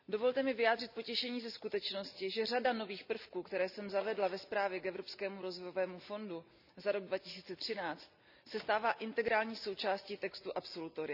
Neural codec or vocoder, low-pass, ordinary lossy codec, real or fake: none; 5.4 kHz; none; real